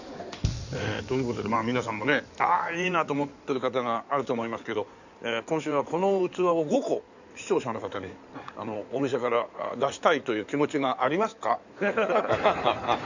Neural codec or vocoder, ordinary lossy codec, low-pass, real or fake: codec, 16 kHz in and 24 kHz out, 2.2 kbps, FireRedTTS-2 codec; none; 7.2 kHz; fake